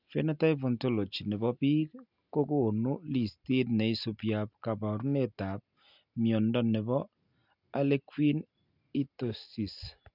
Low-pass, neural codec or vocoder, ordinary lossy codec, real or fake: 5.4 kHz; none; none; real